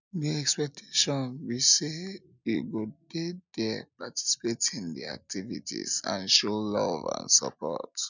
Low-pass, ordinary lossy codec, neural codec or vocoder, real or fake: 7.2 kHz; none; none; real